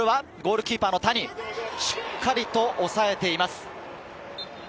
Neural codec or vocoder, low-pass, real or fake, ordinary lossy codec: none; none; real; none